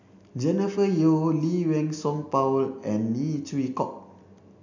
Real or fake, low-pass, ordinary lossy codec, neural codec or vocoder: real; 7.2 kHz; none; none